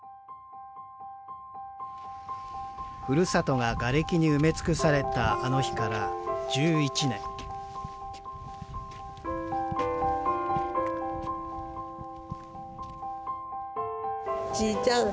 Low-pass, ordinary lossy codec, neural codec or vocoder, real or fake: none; none; none; real